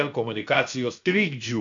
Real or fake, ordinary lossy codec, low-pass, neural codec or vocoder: fake; AAC, 64 kbps; 7.2 kHz; codec, 16 kHz, 0.7 kbps, FocalCodec